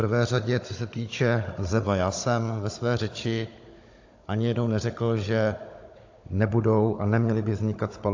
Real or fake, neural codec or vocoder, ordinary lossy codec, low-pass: fake; codec, 16 kHz, 16 kbps, FunCodec, trained on Chinese and English, 50 frames a second; AAC, 48 kbps; 7.2 kHz